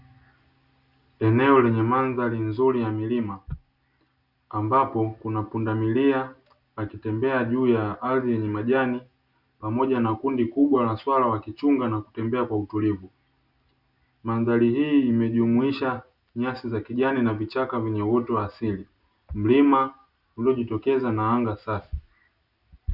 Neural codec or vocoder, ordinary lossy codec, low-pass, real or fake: none; Opus, 64 kbps; 5.4 kHz; real